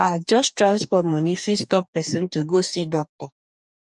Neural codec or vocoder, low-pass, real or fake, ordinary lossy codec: codec, 44.1 kHz, 2.6 kbps, DAC; 10.8 kHz; fake; none